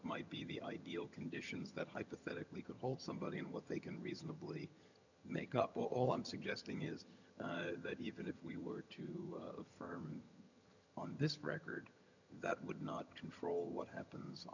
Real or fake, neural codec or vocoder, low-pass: fake; vocoder, 22.05 kHz, 80 mel bands, HiFi-GAN; 7.2 kHz